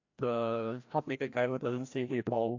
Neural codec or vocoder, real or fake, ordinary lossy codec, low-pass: codec, 16 kHz, 1 kbps, FreqCodec, larger model; fake; AAC, 48 kbps; 7.2 kHz